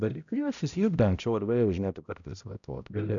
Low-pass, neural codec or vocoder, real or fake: 7.2 kHz; codec, 16 kHz, 0.5 kbps, X-Codec, HuBERT features, trained on balanced general audio; fake